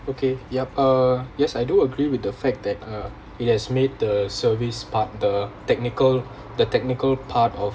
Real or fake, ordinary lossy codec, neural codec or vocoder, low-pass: real; none; none; none